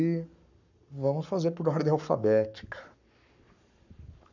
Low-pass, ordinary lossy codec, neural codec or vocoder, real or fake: 7.2 kHz; none; codec, 44.1 kHz, 7.8 kbps, Pupu-Codec; fake